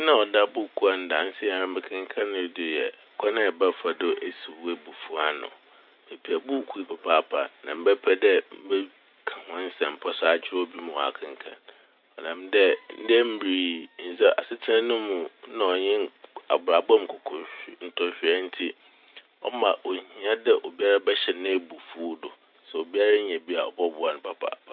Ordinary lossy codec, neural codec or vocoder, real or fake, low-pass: none; none; real; 5.4 kHz